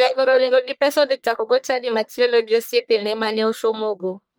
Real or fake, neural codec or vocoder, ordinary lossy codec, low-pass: fake; codec, 44.1 kHz, 1.7 kbps, Pupu-Codec; none; none